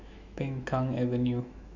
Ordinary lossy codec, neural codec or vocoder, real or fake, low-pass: none; autoencoder, 48 kHz, 128 numbers a frame, DAC-VAE, trained on Japanese speech; fake; 7.2 kHz